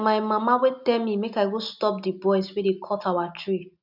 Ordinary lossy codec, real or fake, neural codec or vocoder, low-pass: none; real; none; 5.4 kHz